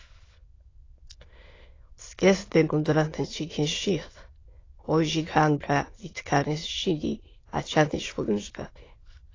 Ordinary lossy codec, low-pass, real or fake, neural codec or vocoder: AAC, 32 kbps; 7.2 kHz; fake; autoencoder, 22.05 kHz, a latent of 192 numbers a frame, VITS, trained on many speakers